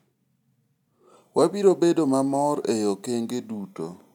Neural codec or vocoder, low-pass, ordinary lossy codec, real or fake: none; 19.8 kHz; none; real